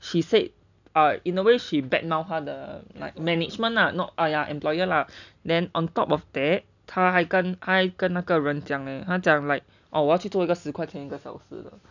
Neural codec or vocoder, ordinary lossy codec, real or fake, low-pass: none; none; real; 7.2 kHz